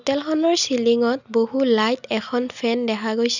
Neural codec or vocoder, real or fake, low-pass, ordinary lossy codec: none; real; 7.2 kHz; none